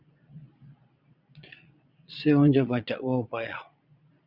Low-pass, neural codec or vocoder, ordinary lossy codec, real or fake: 5.4 kHz; vocoder, 22.05 kHz, 80 mel bands, Vocos; Opus, 64 kbps; fake